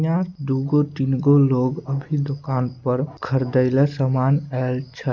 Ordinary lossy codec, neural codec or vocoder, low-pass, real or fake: none; none; 7.2 kHz; real